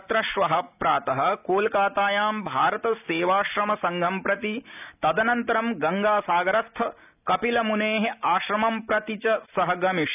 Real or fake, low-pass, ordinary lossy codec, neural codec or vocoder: real; 3.6 kHz; none; none